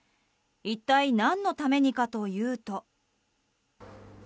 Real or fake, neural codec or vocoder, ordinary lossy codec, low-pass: real; none; none; none